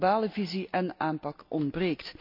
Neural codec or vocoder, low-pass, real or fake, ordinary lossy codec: none; 5.4 kHz; real; MP3, 48 kbps